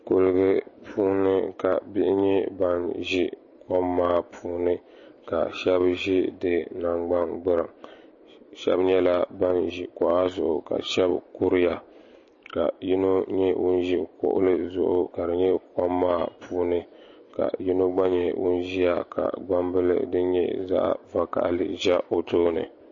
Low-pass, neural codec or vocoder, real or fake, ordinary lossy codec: 7.2 kHz; none; real; MP3, 32 kbps